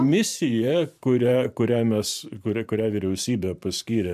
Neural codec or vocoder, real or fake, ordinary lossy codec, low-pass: vocoder, 44.1 kHz, 128 mel bands every 512 samples, BigVGAN v2; fake; MP3, 96 kbps; 14.4 kHz